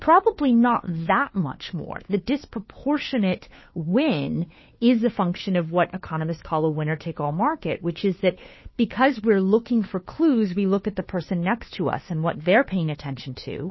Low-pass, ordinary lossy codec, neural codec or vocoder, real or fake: 7.2 kHz; MP3, 24 kbps; codec, 16 kHz, 2 kbps, FunCodec, trained on Chinese and English, 25 frames a second; fake